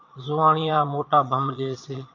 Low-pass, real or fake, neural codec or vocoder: 7.2 kHz; fake; vocoder, 22.05 kHz, 80 mel bands, Vocos